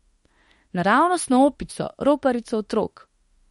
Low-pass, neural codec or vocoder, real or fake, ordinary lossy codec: 19.8 kHz; autoencoder, 48 kHz, 32 numbers a frame, DAC-VAE, trained on Japanese speech; fake; MP3, 48 kbps